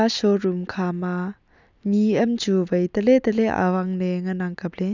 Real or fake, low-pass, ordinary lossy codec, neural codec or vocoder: real; 7.2 kHz; none; none